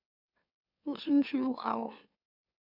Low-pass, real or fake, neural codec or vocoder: 5.4 kHz; fake; autoencoder, 44.1 kHz, a latent of 192 numbers a frame, MeloTTS